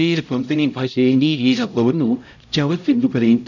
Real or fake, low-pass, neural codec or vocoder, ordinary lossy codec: fake; 7.2 kHz; codec, 16 kHz, 0.5 kbps, X-Codec, HuBERT features, trained on LibriSpeech; none